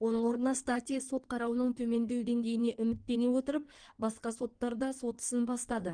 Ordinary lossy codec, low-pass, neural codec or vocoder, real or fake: Opus, 24 kbps; 9.9 kHz; codec, 16 kHz in and 24 kHz out, 1.1 kbps, FireRedTTS-2 codec; fake